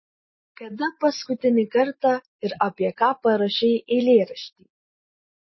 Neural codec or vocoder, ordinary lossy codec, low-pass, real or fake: none; MP3, 24 kbps; 7.2 kHz; real